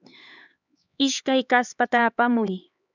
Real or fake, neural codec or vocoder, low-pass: fake; codec, 16 kHz, 4 kbps, X-Codec, HuBERT features, trained on LibriSpeech; 7.2 kHz